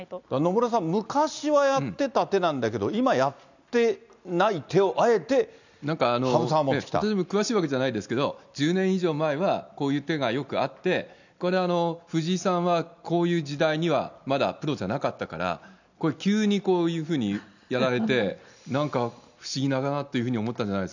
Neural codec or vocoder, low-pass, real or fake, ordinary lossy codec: none; 7.2 kHz; real; none